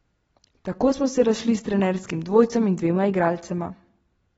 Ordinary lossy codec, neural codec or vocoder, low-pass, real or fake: AAC, 24 kbps; none; 19.8 kHz; real